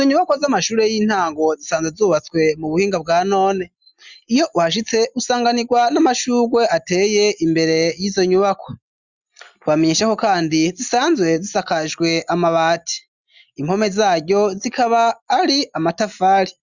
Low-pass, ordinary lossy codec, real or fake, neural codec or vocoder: 7.2 kHz; Opus, 64 kbps; real; none